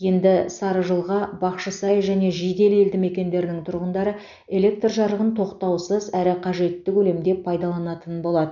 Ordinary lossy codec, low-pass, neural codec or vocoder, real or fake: none; 7.2 kHz; none; real